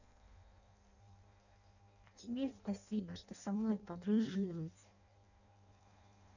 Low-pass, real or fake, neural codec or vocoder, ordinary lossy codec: 7.2 kHz; fake; codec, 16 kHz in and 24 kHz out, 0.6 kbps, FireRedTTS-2 codec; none